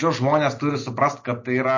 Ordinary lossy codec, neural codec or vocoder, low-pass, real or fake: MP3, 32 kbps; none; 7.2 kHz; real